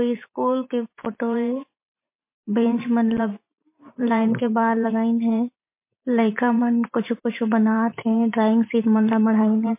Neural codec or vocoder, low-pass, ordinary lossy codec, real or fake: vocoder, 44.1 kHz, 128 mel bands every 512 samples, BigVGAN v2; 3.6 kHz; MP3, 24 kbps; fake